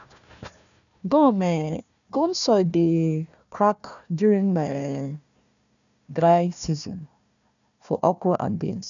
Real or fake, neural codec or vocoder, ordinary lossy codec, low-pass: fake; codec, 16 kHz, 1 kbps, FunCodec, trained on Chinese and English, 50 frames a second; none; 7.2 kHz